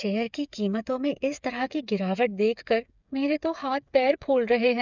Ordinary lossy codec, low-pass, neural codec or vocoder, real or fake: none; 7.2 kHz; codec, 16 kHz, 8 kbps, FreqCodec, smaller model; fake